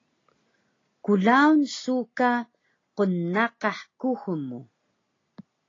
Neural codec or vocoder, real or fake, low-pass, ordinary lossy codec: none; real; 7.2 kHz; AAC, 32 kbps